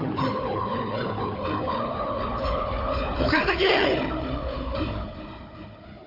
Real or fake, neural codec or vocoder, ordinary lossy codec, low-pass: fake; codec, 16 kHz, 4 kbps, FunCodec, trained on Chinese and English, 50 frames a second; AAC, 32 kbps; 5.4 kHz